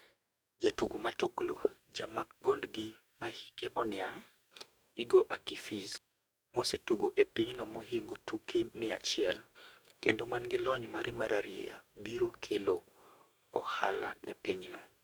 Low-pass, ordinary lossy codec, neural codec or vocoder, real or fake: none; none; codec, 44.1 kHz, 2.6 kbps, DAC; fake